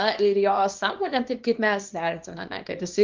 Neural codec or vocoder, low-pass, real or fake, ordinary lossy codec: codec, 24 kHz, 0.9 kbps, WavTokenizer, small release; 7.2 kHz; fake; Opus, 32 kbps